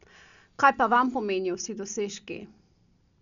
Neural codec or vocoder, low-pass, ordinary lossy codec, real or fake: none; 7.2 kHz; none; real